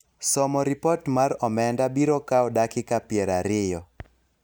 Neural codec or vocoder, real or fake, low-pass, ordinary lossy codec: none; real; none; none